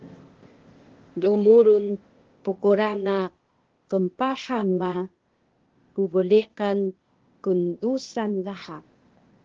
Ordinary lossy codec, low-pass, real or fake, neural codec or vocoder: Opus, 16 kbps; 7.2 kHz; fake; codec, 16 kHz, 0.8 kbps, ZipCodec